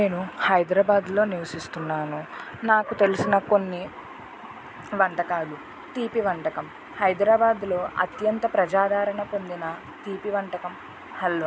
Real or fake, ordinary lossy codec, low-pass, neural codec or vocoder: real; none; none; none